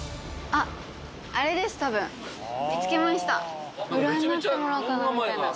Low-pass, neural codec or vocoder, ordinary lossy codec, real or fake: none; none; none; real